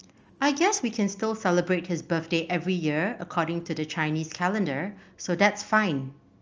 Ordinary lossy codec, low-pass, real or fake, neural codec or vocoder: Opus, 24 kbps; 7.2 kHz; real; none